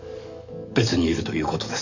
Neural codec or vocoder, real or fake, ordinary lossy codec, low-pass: codec, 44.1 kHz, 7.8 kbps, DAC; fake; none; 7.2 kHz